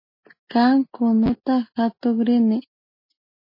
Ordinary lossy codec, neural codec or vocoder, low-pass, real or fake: MP3, 24 kbps; none; 5.4 kHz; real